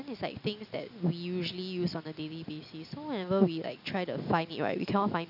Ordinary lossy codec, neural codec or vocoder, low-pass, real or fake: none; none; 5.4 kHz; real